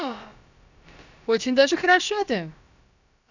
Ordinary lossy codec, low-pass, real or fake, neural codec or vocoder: none; 7.2 kHz; fake; codec, 16 kHz, about 1 kbps, DyCAST, with the encoder's durations